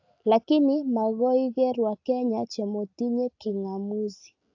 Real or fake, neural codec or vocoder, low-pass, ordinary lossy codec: real; none; 7.2 kHz; AAC, 48 kbps